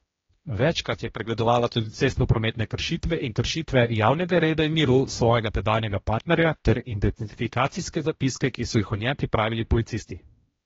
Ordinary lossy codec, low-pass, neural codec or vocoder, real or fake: AAC, 24 kbps; 7.2 kHz; codec, 16 kHz, 1 kbps, X-Codec, HuBERT features, trained on general audio; fake